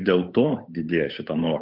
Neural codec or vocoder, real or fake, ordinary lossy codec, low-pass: codec, 16 kHz, 8 kbps, FunCodec, trained on Chinese and English, 25 frames a second; fake; MP3, 48 kbps; 5.4 kHz